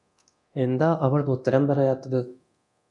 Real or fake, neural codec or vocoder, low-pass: fake; codec, 24 kHz, 0.9 kbps, DualCodec; 10.8 kHz